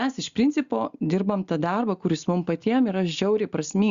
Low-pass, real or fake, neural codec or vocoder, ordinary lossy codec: 7.2 kHz; real; none; Opus, 64 kbps